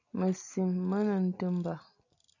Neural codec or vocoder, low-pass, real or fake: none; 7.2 kHz; real